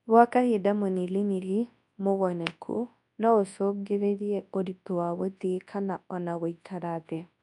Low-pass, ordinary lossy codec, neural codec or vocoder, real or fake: 10.8 kHz; none; codec, 24 kHz, 0.9 kbps, WavTokenizer, large speech release; fake